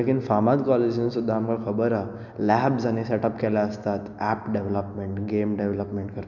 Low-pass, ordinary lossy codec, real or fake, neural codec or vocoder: 7.2 kHz; none; real; none